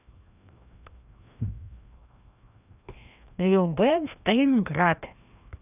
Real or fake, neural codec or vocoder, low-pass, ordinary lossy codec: fake; codec, 16 kHz, 1 kbps, FreqCodec, larger model; 3.6 kHz; none